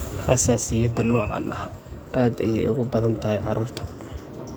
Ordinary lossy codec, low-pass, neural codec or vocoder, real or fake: none; none; codec, 44.1 kHz, 2.6 kbps, SNAC; fake